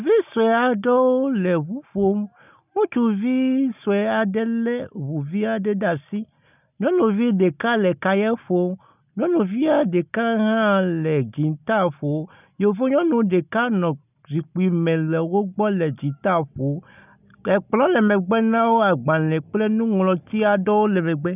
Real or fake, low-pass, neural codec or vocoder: real; 3.6 kHz; none